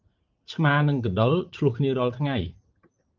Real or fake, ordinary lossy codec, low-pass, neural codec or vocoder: fake; Opus, 24 kbps; 7.2 kHz; vocoder, 44.1 kHz, 80 mel bands, Vocos